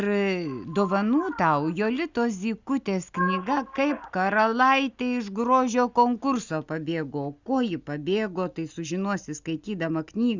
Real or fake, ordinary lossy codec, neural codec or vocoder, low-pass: real; Opus, 64 kbps; none; 7.2 kHz